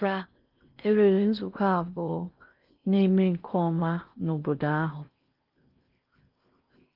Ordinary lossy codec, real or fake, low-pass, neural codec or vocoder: Opus, 24 kbps; fake; 5.4 kHz; codec, 16 kHz in and 24 kHz out, 0.6 kbps, FocalCodec, streaming, 4096 codes